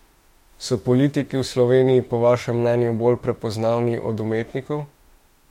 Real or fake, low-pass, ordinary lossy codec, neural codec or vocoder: fake; 19.8 kHz; MP3, 64 kbps; autoencoder, 48 kHz, 32 numbers a frame, DAC-VAE, trained on Japanese speech